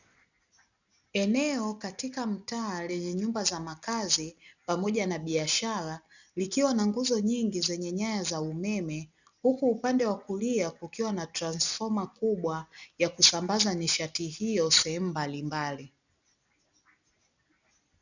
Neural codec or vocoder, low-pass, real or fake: none; 7.2 kHz; real